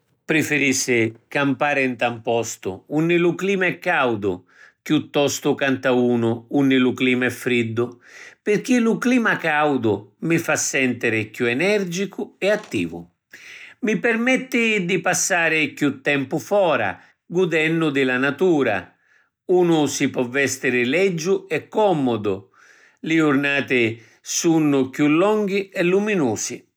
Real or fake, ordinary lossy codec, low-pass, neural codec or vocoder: real; none; none; none